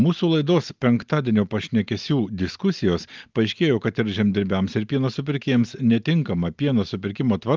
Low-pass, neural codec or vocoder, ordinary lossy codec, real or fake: 7.2 kHz; none; Opus, 32 kbps; real